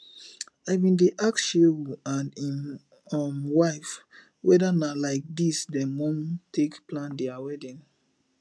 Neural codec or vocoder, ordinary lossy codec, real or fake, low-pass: none; none; real; none